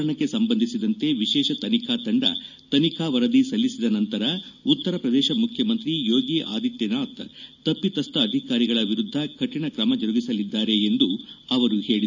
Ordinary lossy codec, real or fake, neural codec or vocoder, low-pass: none; real; none; 7.2 kHz